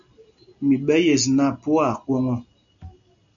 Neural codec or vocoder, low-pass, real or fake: none; 7.2 kHz; real